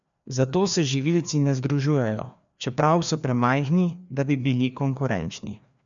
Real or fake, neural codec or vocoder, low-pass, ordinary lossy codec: fake; codec, 16 kHz, 2 kbps, FreqCodec, larger model; 7.2 kHz; none